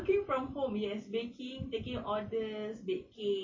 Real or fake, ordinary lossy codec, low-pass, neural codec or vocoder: real; MP3, 32 kbps; 7.2 kHz; none